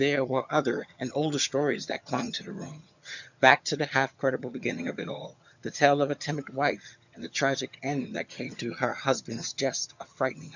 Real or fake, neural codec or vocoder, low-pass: fake; vocoder, 22.05 kHz, 80 mel bands, HiFi-GAN; 7.2 kHz